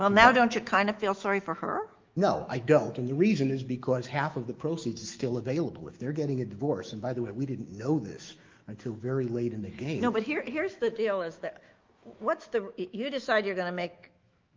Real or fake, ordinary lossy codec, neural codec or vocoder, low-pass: fake; Opus, 32 kbps; autoencoder, 48 kHz, 128 numbers a frame, DAC-VAE, trained on Japanese speech; 7.2 kHz